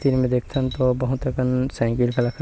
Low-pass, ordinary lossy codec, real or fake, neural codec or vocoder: none; none; real; none